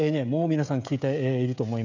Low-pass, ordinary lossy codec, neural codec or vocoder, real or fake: 7.2 kHz; none; codec, 16 kHz, 16 kbps, FreqCodec, smaller model; fake